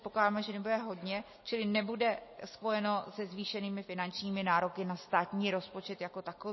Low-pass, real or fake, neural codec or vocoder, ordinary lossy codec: 7.2 kHz; real; none; MP3, 24 kbps